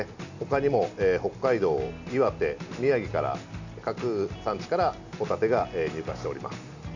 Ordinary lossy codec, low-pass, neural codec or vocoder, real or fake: none; 7.2 kHz; none; real